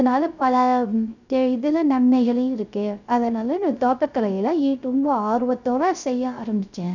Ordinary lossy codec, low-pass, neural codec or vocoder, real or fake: none; 7.2 kHz; codec, 16 kHz, 0.3 kbps, FocalCodec; fake